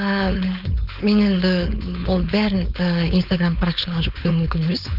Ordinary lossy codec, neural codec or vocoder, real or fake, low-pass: none; codec, 16 kHz, 4.8 kbps, FACodec; fake; 5.4 kHz